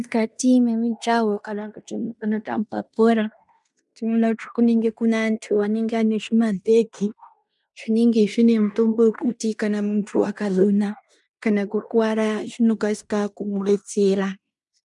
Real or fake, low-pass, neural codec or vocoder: fake; 10.8 kHz; codec, 16 kHz in and 24 kHz out, 0.9 kbps, LongCat-Audio-Codec, fine tuned four codebook decoder